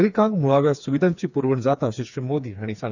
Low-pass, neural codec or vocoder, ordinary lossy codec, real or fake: 7.2 kHz; codec, 16 kHz, 4 kbps, FreqCodec, smaller model; none; fake